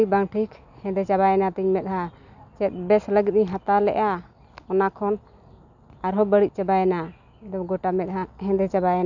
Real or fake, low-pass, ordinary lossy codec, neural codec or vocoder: real; 7.2 kHz; none; none